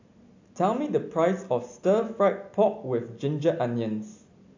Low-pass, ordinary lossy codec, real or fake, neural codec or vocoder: 7.2 kHz; AAC, 48 kbps; real; none